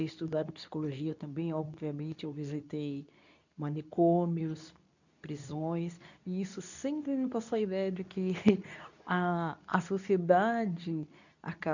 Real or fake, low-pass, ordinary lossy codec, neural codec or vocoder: fake; 7.2 kHz; none; codec, 24 kHz, 0.9 kbps, WavTokenizer, medium speech release version 2